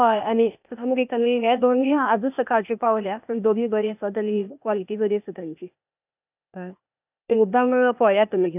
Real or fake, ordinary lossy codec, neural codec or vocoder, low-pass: fake; none; codec, 16 kHz, 0.8 kbps, ZipCodec; 3.6 kHz